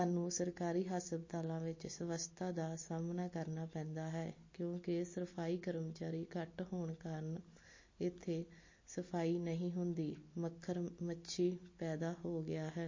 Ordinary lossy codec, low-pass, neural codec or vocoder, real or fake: MP3, 32 kbps; 7.2 kHz; none; real